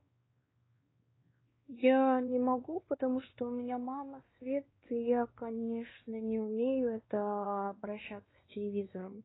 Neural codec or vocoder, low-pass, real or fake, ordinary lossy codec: codec, 16 kHz, 2 kbps, X-Codec, WavLM features, trained on Multilingual LibriSpeech; 7.2 kHz; fake; AAC, 16 kbps